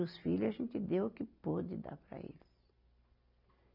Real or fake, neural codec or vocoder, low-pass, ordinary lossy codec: real; none; 5.4 kHz; none